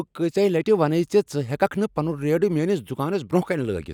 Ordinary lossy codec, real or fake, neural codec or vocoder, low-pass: none; real; none; 19.8 kHz